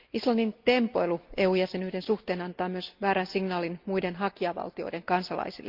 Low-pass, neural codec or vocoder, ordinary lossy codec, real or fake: 5.4 kHz; none; Opus, 24 kbps; real